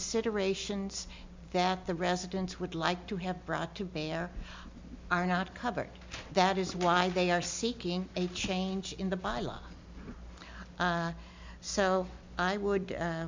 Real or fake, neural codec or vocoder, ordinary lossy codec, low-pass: real; none; MP3, 64 kbps; 7.2 kHz